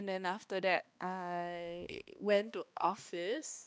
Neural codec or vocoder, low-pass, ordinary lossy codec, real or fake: codec, 16 kHz, 2 kbps, X-Codec, HuBERT features, trained on balanced general audio; none; none; fake